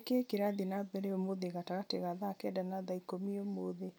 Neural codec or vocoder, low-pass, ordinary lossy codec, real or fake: none; none; none; real